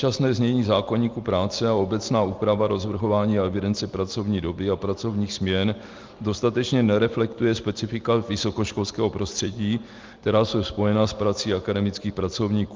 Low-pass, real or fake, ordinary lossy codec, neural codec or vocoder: 7.2 kHz; real; Opus, 32 kbps; none